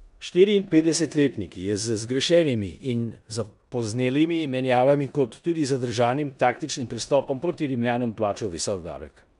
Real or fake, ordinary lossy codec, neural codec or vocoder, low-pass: fake; MP3, 96 kbps; codec, 16 kHz in and 24 kHz out, 0.9 kbps, LongCat-Audio-Codec, four codebook decoder; 10.8 kHz